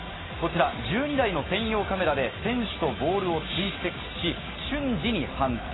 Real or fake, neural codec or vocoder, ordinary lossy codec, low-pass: real; none; AAC, 16 kbps; 7.2 kHz